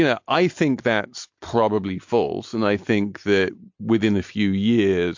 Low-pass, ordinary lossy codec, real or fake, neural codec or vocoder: 7.2 kHz; MP3, 48 kbps; real; none